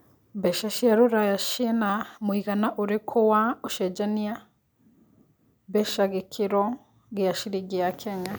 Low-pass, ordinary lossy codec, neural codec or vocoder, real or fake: none; none; none; real